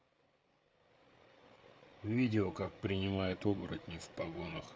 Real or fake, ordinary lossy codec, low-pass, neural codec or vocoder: fake; none; none; codec, 16 kHz, 8 kbps, FreqCodec, larger model